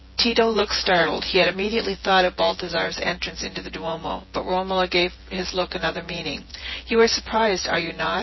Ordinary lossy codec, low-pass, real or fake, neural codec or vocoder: MP3, 24 kbps; 7.2 kHz; fake; vocoder, 24 kHz, 100 mel bands, Vocos